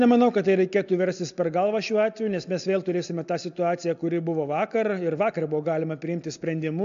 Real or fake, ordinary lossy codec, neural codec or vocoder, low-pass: real; MP3, 64 kbps; none; 7.2 kHz